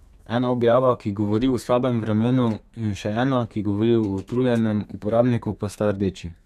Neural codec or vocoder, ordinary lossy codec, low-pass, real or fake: codec, 32 kHz, 1.9 kbps, SNAC; none; 14.4 kHz; fake